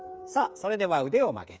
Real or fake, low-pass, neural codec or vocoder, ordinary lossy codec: fake; none; codec, 16 kHz, 8 kbps, FreqCodec, smaller model; none